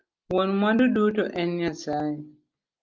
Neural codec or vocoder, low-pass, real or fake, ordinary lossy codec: none; 7.2 kHz; real; Opus, 24 kbps